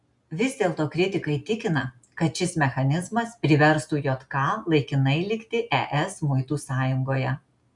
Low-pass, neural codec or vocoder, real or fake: 10.8 kHz; none; real